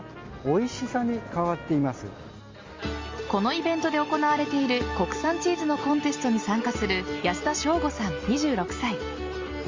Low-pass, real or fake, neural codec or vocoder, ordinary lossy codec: 7.2 kHz; real; none; Opus, 32 kbps